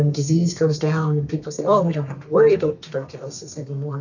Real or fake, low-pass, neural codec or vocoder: fake; 7.2 kHz; codec, 32 kHz, 1.9 kbps, SNAC